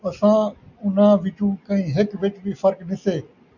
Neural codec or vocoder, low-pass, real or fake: none; 7.2 kHz; real